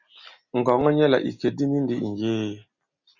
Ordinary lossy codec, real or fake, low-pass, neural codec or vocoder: Opus, 64 kbps; real; 7.2 kHz; none